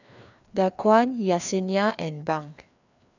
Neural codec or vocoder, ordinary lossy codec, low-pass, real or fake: codec, 16 kHz, 2 kbps, FreqCodec, larger model; none; 7.2 kHz; fake